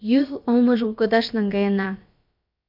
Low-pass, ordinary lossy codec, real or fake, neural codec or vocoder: 5.4 kHz; MP3, 48 kbps; fake; codec, 16 kHz, about 1 kbps, DyCAST, with the encoder's durations